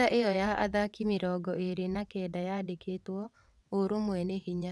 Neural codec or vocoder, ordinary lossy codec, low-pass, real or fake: vocoder, 22.05 kHz, 80 mel bands, WaveNeXt; none; none; fake